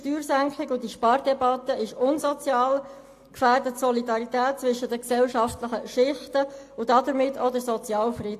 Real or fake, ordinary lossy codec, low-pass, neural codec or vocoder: real; AAC, 64 kbps; 14.4 kHz; none